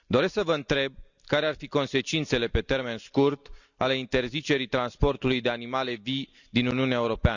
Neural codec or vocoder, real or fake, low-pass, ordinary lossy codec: none; real; 7.2 kHz; none